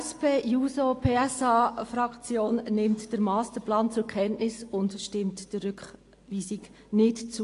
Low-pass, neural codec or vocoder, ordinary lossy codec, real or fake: 10.8 kHz; none; AAC, 48 kbps; real